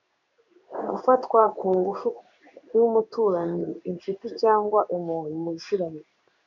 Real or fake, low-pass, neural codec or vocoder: fake; 7.2 kHz; codec, 16 kHz in and 24 kHz out, 1 kbps, XY-Tokenizer